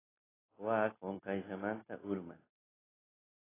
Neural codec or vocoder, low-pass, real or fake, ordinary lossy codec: none; 3.6 kHz; real; AAC, 16 kbps